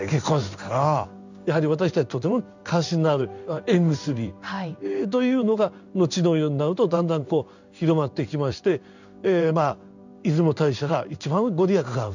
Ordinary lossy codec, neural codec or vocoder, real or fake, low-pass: none; codec, 16 kHz in and 24 kHz out, 1 kbps, XY-Tokenizer; fake; 7.2 kHz